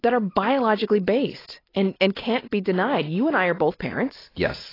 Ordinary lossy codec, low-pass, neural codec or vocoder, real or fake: AAC, 24 kbps; 5.4 kHz; none; real